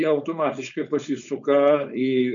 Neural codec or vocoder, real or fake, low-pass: codec, 16 kHz, 4.8 kbps, FACodec; fake; 7.2 kHz